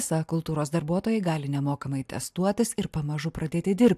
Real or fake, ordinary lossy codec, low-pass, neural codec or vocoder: real; AAC, 96 kbps; 14.4 kHz; none